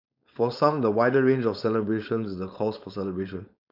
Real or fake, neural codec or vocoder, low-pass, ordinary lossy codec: fake; codec, 16 kHz, 4.8 kbps, FACodec; 5.4 kHz; none